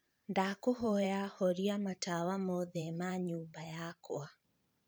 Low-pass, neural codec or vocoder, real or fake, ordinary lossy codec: none; vocoder, 44.1 kHz, 128 mel bands every 512 samples, BigVGAN v2; fake; none